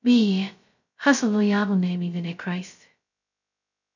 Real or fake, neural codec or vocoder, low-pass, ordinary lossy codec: fake; codec, 16 kHz, 0.2 kbps, FocalCodec; 7.2 kHz; none